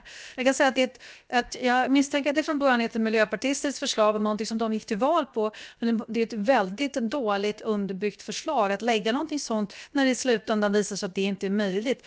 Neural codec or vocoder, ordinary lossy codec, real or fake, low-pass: codec, 16 kHz, 0.7 kbps, FocalCodec; none; fake; none